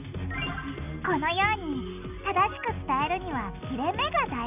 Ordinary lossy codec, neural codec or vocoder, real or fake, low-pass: none; none; real; 3.6 kHz